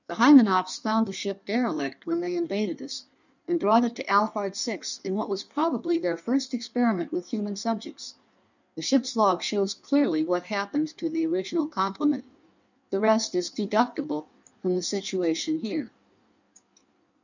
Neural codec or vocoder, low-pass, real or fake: codec, 16 kHz in and 24 kHz out, 1.1 kbps, FireRedTTS-2 codec; 7.2 kHz; fake